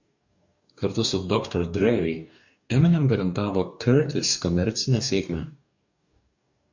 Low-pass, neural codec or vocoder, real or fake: 7.2 kHz; codec, 44.1 kHz, 2.6 kbps, DAC; fake